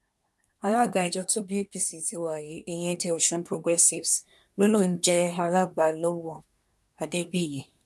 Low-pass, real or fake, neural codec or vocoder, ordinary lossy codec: none; fake; codec, 24 kHz, 1 kbps, SNAC; none